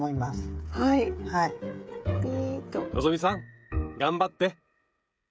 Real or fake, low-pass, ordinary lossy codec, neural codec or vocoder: fake; none; none; codec, 16 kHz, 16 kbps, FreqCodec, smaller model